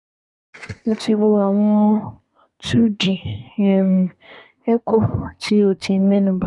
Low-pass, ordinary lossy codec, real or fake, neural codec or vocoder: 10.8 kHz; none; fake; codec, 24 kHz, 1 kbps, SNAC